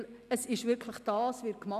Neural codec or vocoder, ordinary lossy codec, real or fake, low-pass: none; none; real; 14.4 kHz